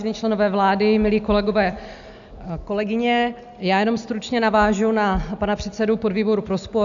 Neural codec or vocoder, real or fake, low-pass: none; real; 7.2 kHz